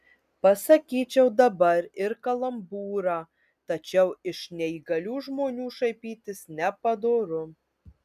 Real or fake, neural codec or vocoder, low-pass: real; none; 14.4 kHz